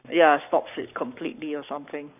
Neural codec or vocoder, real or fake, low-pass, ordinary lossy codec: codec, 44.1 kHz, 7.8 kbps, Pupu-Codec; fake; 3.6 kHz; none